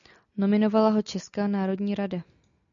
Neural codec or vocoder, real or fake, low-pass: none; real; 7.2 kHz